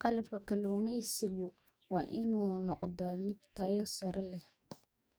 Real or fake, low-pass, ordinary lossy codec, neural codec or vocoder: fake; none; none; codec, 44.1 kHz, 2.6 kbps, DAC